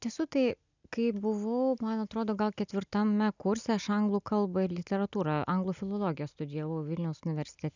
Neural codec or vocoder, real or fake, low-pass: none; real; 7.2 kHz